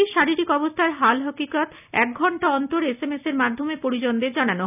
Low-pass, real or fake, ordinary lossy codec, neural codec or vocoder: 3.6 kHz; real; none; none